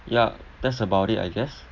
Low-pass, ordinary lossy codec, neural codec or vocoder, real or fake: 7.2 kHz; none; none; real